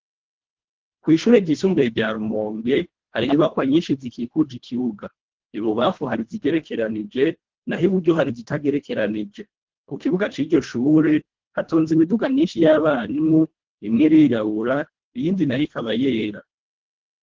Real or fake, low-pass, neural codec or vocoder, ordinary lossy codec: fake; 7.2 kHz; codec, 24 kHz, 1.5 kbps, HILCodec; Opus, 16 kbps